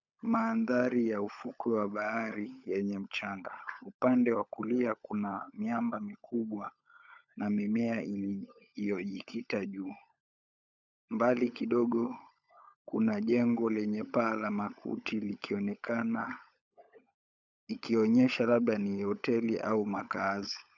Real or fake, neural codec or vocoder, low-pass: fake; codec, 16 kHz, 16 kbps, FunCodec, trained on LibriTTS, 50 frames a second; 7.2 kHz